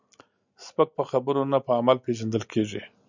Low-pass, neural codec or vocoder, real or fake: 7.2 kHz; none; real